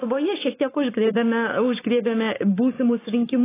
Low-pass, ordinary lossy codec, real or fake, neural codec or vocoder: 3.6 kHz; AAC, 16 kbps; fake; codec, 44.1 kHz, 7.8 kbps, Pupu-Codec